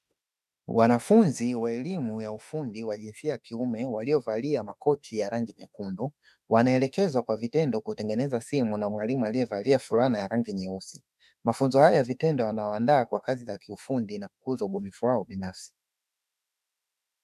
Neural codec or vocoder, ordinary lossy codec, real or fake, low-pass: autoencoder, 48 kHz, 32 numbers a frame, DAC-VAE, trained on Japanese speech; MP3, 96 kbps; fake; 14.4 kHz